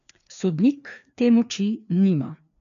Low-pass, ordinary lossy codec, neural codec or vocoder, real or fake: 7.2 kHz; none; codec, 16 kHz, 2 kbps, FreqCodec, larger model; fake